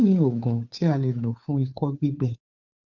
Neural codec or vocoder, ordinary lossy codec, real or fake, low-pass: codec, 16 kHz, 2 kbps, FunCodec, trained on Chinese and English, 25 frames a second; none; fake; 7.2 kHz